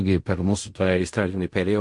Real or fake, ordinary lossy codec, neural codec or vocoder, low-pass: fake; MP3, 48 kbps; codec, 16 kHz in and 24 kHz out, 0.4 kbps, LongCat-Audio-Codec, fine tuned four codebook decoder; 10.8 kHz